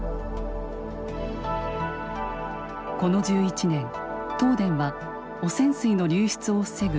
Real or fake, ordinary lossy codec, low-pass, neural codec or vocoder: real; none; none; none